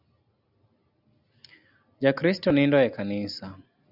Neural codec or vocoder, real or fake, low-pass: none; real; 5.4 kHz